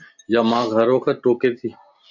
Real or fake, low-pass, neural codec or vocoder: real; 7.2 kHz; none